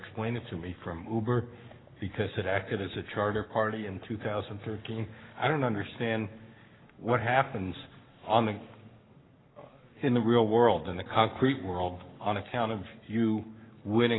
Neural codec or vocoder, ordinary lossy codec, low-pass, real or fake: codec, 44.1 kHz, 7.8 kbps, Pupu-Codec; AAC, 16 kbps; 7.2 kHz; fake